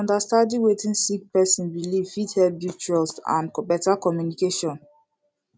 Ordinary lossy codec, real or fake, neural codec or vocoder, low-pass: none; real; none; none